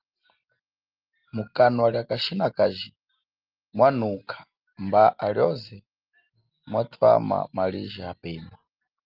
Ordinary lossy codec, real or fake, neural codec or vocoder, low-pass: Opus, 32 kbps; real; none; 5.4 kHz